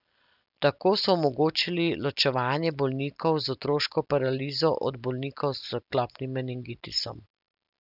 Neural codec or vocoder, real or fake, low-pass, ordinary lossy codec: none; real; 5.4 kHz; none